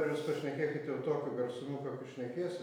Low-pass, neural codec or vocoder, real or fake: 19.8 kHz; none; real